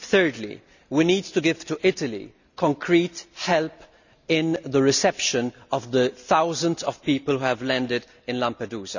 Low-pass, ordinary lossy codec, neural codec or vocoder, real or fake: 7.2 kHz; none; none; real